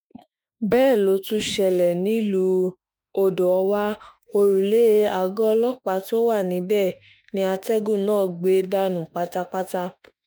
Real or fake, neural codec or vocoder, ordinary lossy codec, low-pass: fake; autoencoder, 48 kHz, 32 numbers a frame, DAC-VAE, trained on Japanese speech; none; none